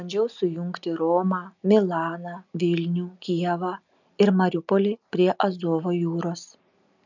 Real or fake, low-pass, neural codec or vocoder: real; 7.2 kHz; none